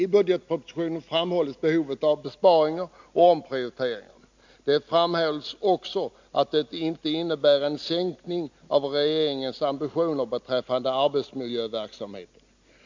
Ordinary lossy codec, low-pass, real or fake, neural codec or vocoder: MP3, 64 kbps; 7.2 kHz; real; none